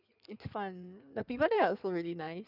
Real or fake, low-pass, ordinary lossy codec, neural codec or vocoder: fake; 5.4 kHz; none; codec, 16 kHz in and 24 kHz out, 2.2 kbps, FireRedTTS-2 codec